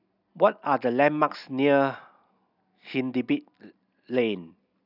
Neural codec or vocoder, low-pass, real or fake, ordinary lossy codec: none; 5.4 kHz; real; none